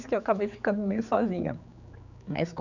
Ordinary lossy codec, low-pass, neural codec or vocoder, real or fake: none; 7.2 kHz; codec, 16 kHz, 4 kbps, X-Codec, HuBERT features, trained on general audio; fake